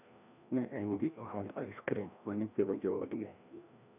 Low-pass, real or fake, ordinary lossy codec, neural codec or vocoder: 3.6 kHz; fake; none; codec, 16 kHz, 1 kbps, FreqCodec, larger model